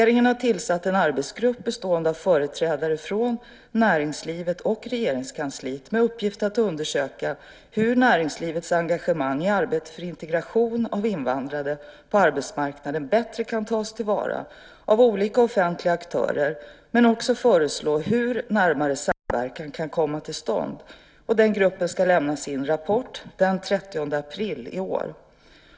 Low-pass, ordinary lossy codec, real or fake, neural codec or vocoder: none; none; real; none